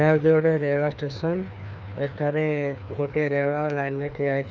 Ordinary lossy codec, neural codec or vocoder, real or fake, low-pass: none; codec, 16 kHz, 2 kbps, FreqCodec, larger model; fake; none